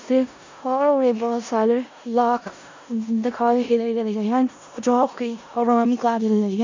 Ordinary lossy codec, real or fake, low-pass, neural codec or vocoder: MP3, 64 kbps; fake; 7.2 kHz; codec, 16 kHz in and 24 kHz out, 0.4 kbps, LongCat-Audio-Codec, four codebook decoder